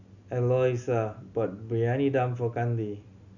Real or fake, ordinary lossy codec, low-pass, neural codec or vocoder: real; none; 7.2 kHz; none